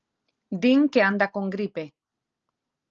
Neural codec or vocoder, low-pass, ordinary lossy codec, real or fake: none; 7.2 kHz; Opus, 24 kbps; real